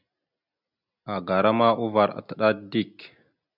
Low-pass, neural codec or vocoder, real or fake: 5.4 kHz; none; real